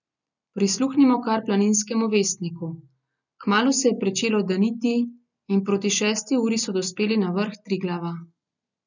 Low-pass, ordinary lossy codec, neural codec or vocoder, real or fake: 7.2 kHz; none; none; real